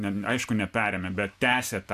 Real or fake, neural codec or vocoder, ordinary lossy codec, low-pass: real; none; AAC, 96 kbps; 14.4 kHz